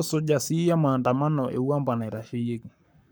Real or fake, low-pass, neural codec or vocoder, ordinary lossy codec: fake; none; codec, 44.1 kHz, 7.8 kbps, Pupu-Codec; none